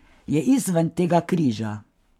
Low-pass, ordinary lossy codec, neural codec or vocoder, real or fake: 19.8 kHz; MP3, 96 kbps; codec, 44.1 kHz, 7.8 kbps, Pupu-Codec; fake